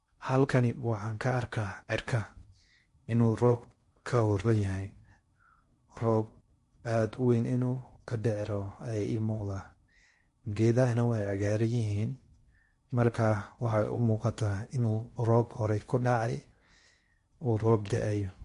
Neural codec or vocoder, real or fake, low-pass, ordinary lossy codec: codec, 16 kHz in and 24 kHz out, 0.6 kbps, FocalCodec, streaming, 2048 codes; fake; 10.8 kHz; MP3, 48 kbps